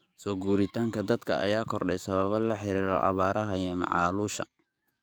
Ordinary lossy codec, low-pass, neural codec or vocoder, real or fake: none; none; codec, 44.1 kHz, 7.8 kbps, DAC; fake